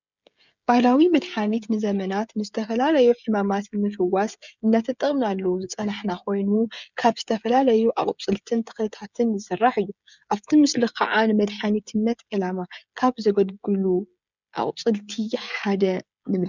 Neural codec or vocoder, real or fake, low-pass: codec, 16 kHz, 16 kbps, FreqCodec, smaller model; fake; 7.2 kHz